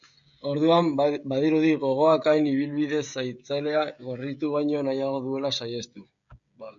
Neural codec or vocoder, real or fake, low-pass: codec, 16 kHz, 16 kbps, FreqCodec, smaller model; fake; 7.2 kHz